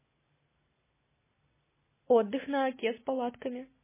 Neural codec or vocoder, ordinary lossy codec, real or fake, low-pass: none; MP3, 16 kbps; real; 3.6 kHz